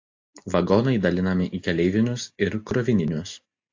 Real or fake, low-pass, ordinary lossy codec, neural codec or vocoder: real; 7.2 kHz; AAC, 48 kbps; none